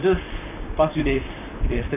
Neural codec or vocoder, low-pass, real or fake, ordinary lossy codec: vocoder, 44.1 kHz, 128 mel bands, Pupu-Vocoder; 3.6 kHz; fake; none